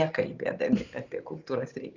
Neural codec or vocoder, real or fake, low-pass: none; real; 7.2 kHz